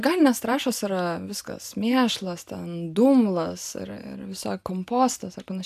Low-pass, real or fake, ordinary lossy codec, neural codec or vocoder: 14.4 kHz; real; AAC, 96 kbps; none